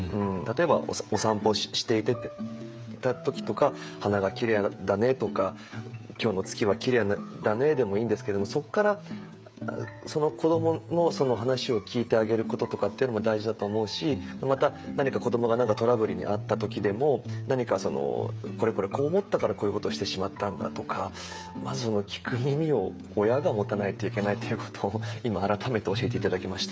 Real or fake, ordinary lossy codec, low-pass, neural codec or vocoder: fake; none; none; codec, 16 kHz, 16 kbps, FreqCodec, smaller model